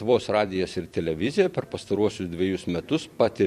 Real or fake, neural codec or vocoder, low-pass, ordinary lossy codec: fake; vocoder, 44.1 kHz, 128 mel bands every 256 samples, BigVGAN v2; 14.4 kHz; MP3, 64 kbps